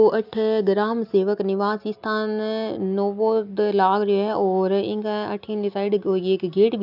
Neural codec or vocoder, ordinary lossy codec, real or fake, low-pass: none; none; real; 5.4 kHz